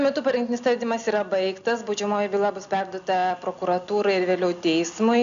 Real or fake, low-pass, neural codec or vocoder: real; 7.2 kHz; none